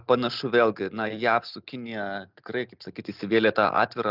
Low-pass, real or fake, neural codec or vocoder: 5.4 kHz; real; none